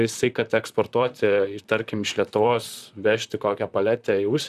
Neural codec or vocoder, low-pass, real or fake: vocoder, 44.1 kHz, 128 mel bands, Pupu-Vocoder; 14.4 kHz; fake